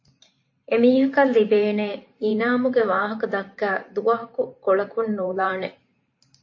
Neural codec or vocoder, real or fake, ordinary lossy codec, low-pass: vocoder, 44.1 kHz, 128 mel bands every 512 samples, BigVGAN v2; fake; MP3, 32 kbps; 7.2 kHz